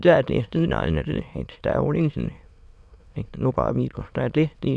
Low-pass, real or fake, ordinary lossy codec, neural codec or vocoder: none; fake; none; autoencoder, 22.05 kHz, a latent of 192 numbers a frame, VITS, trained on many speakers